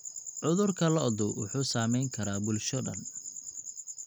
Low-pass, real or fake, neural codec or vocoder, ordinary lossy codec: 19.8 kHz; real; none; none